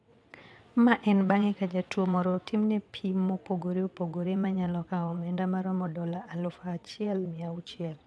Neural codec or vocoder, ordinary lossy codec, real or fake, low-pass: vocoder, 22.05 kHz, 80 mel bands, WaveNeXt; none; fake; none